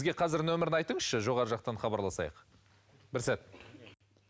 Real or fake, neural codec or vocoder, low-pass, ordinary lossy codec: real; none; none; none